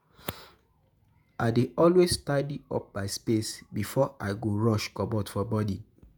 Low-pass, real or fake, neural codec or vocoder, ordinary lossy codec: none; fake; vocoder, 48 kHz, 128 mel bands, Vocos; none